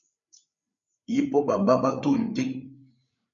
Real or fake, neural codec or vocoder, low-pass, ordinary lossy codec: fake; codec, 16 kHz, 8 kbps, FreqCodec, larger model; 7.2 kHz; MP3, 64 kbps